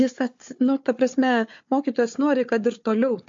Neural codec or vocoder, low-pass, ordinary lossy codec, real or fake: codec, 16 kHz, 4 kbps, FunCodec, trained on Chinese and English, 50 frames a second; 7.2 kHz; MP3, 48 kbps; fake